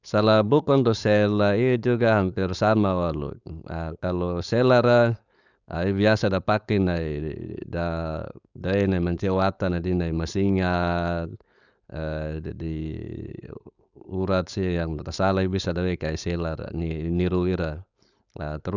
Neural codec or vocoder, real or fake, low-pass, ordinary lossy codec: codec, 16 kHz, 4.8 kbps, FACodec; fake; 7.2 kHz; none